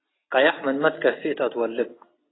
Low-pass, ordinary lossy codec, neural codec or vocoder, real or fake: 7.2 kHz; AAC, 16 kbps; none; real